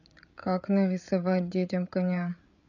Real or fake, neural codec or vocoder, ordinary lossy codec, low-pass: fake; codec, 16 kHz, 8 kbps, FreqCodec, larger model; none; 7.2 kHz